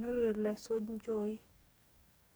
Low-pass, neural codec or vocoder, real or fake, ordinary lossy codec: none; codec, 44.1 kHz, 2.6 kbps, DAC; fake; none